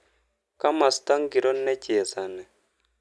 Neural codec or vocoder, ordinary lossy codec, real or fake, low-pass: none; none; real; none